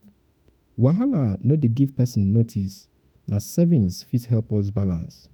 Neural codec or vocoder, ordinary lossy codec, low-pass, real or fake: autoencoder, 48 kHz, 32 numbers a frame, DAC-VAE, trained on Japanese speech; none; none; fake